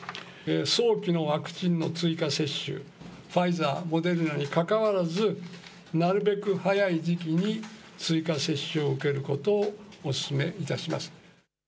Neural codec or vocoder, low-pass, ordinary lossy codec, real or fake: none; none; none; real